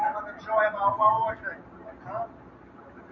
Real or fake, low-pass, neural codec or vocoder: real; 7.2 kHz; none